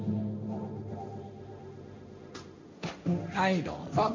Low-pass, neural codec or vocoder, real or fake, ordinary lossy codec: 7.2 kHz; codec, 16 kHz, 1.1 kbps, Voila-Tokenizer; fake; MP3, 64 kbps